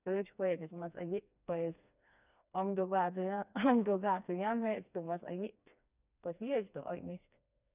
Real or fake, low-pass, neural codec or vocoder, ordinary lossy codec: fake; 3.6 kHz; codec, 16 kHz, 2 kbps, FreqCodec, smaller model; none